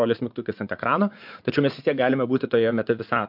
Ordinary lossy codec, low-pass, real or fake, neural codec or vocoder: MP3, 48 kbps; 5.4 kHz; fake; vocoder, 44.1 kHz, 80 mel bands, Vocos